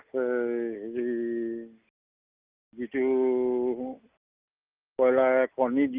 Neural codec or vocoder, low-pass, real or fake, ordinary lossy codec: none; 3.6 kHz; real; Opus, 24 kbps